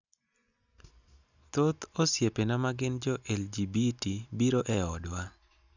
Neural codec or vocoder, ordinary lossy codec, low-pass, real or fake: none; none; 7.2 kHz; real